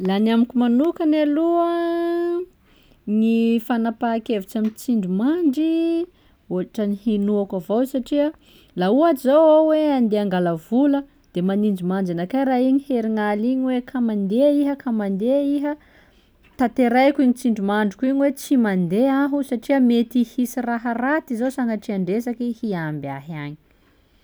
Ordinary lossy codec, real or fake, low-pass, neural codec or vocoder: none; real; none; none